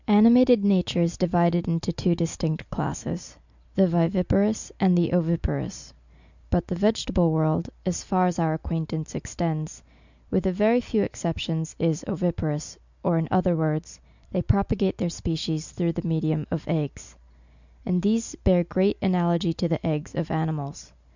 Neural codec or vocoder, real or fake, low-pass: none; real; 7.2 kHz